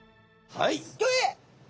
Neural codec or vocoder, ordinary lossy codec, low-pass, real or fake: none; none; none; real